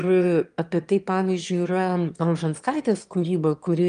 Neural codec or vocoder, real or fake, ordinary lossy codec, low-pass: autoencoder, 22.05 kHz, a latent of 192 numbers a frame, VITS, trained on one speaker; fake; Opus, 32 kbps; 9.9 kHz